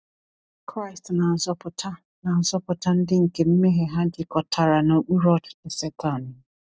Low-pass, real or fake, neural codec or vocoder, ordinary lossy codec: none; real; none; none